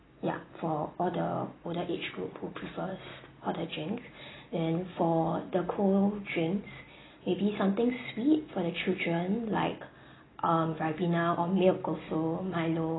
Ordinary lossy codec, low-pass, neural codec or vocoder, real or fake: AAC, 16 kbps; 7.2 kHz; none; real